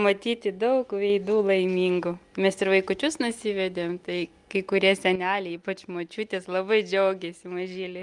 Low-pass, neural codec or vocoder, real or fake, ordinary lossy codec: 10.8 kHz; none; real; Opus, 32 kbps